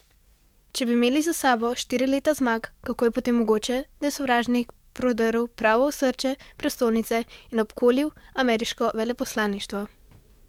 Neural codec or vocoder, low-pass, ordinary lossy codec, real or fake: codec, 44.1 kHz, 7.8 kbps, DAC; 19.8 kHz; MP3, 96 kbps; fake